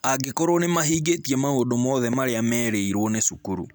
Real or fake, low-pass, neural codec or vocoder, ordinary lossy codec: real; none; none; none